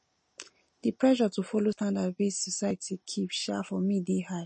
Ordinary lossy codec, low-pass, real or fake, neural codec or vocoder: MP3, 32 kbps; 10.8 kHz; real; none